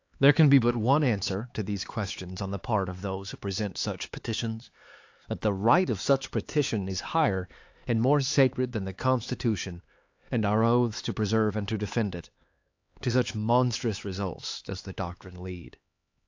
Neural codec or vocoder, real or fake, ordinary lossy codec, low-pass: codec, 16 kHz, 4 kbps, X-Codec, HuBERT features, trained on LibriSpeech; fake; AAC, 48 kbps; 7.2 kHz